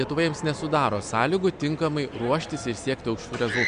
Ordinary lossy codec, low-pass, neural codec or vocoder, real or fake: MP3, 64 kbps; 9.9 kHz; none; real